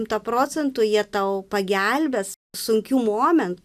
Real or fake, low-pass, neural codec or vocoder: real; 14.4 kHz; none